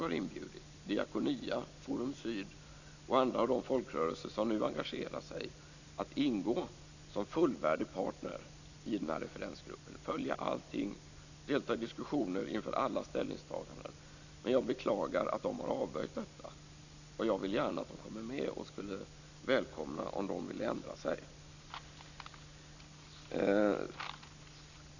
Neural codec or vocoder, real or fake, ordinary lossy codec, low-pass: none; real; none; 7.2 kHz